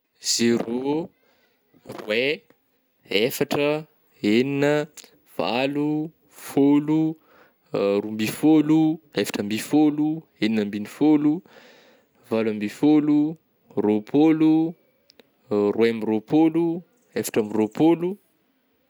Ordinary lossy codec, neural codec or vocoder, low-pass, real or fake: none; none; none; real